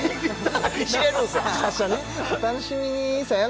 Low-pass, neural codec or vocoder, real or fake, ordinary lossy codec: none; none; real; none